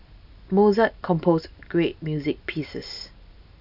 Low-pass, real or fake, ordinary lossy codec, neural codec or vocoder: 5.4 kHz; real; none; none